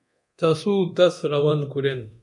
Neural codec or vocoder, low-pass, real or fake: codec, 24 kHz, 0.9 kbps, DualCodec; 10.8 kHz; fake